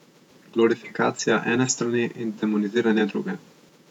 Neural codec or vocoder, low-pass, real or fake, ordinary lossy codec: vocoder, 48 kHz, 128 mel bands, Vocos; 19.8 kHz; fake; none